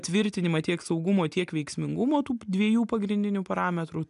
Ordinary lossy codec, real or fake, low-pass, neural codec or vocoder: AAC, 96 kbps; real; 10.8 kHz; none